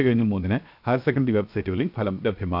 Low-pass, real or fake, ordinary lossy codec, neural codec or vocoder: 5.4 kHz; fake; none; codec, 16 kHz, 0.7 kbps, FocalCodec